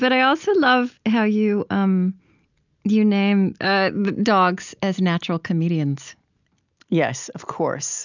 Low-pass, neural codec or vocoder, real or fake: 7.2 kHz; none; real